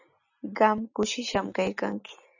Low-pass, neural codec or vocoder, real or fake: 7.2 kHz; none; real